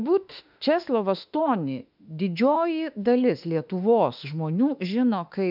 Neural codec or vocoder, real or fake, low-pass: autoencoder, 48 kHz, 32 numbers a frame, DAC-VAE, trained on Japanese speech; fake; 5.4 kHz